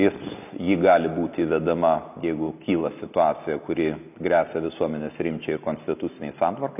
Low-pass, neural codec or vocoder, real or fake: 3.6 kHz; none; real